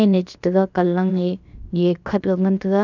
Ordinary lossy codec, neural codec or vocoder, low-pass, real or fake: none; codec, 16 kHz, about 1 kbps, DyCAST, with the encoder's durations; 7.2 kHz; fake